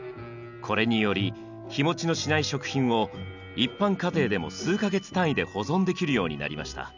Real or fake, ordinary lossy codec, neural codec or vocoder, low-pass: real; none; none; 7.2 kHz